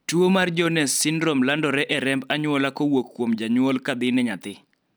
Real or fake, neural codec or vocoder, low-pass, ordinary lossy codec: real; none; none; none